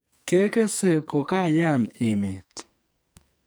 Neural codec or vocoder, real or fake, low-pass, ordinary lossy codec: codec, 44.1 kHz, 2.6 kbps, SNAC; fake; none; none